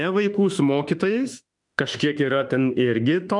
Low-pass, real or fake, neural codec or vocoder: 10.8 kHz; fake; autoencoder, 48 kHz, 32 numbers a frame, DAC-VAE, trained on Japanese speech